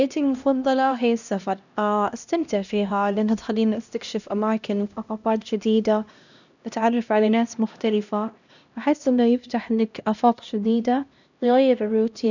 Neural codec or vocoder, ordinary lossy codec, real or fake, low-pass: codec, 16 kHz, 1 kbps, X-Codec, HuBERT features, trained on LibriSpeech; none; fake; 7.2 kHz